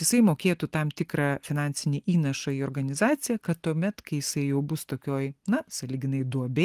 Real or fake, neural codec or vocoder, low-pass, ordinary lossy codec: real; none; 14.4 kHz; Opus, 24 kbps